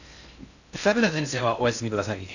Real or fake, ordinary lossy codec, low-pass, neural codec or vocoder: fake; none; 7.2 kHz; codec, 16 kHz in and 24 kHz out, 0.6 kbps, FocalCodec, streaming, 4096 codes